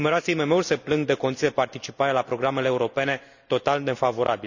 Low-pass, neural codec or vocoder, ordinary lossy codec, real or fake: 7.2 kHz; none; none; real